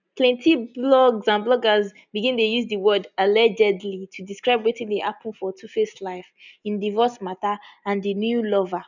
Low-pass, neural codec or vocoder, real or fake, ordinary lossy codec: 7.2 kHz; none; real; none